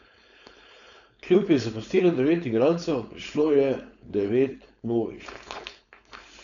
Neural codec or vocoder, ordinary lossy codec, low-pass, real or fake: codec, 16 kHz, 4.8 kbps, FACodec; none; 7.2 kHz; fake